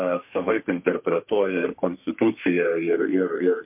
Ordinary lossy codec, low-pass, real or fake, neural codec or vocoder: MP3, 32 kbps; 3.6 kHz; fake; codec, 32 kHz, 1.9 kbps, SNAC